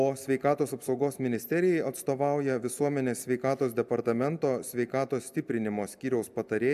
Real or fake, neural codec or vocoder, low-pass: real; none; 14.4 kHz